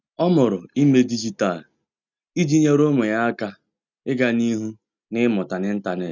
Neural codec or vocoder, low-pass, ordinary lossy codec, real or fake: none; 7.2 kHz; none; real